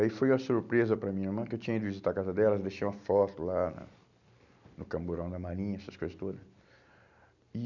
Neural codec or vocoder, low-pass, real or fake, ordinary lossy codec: none; 7.2 kHz; real; none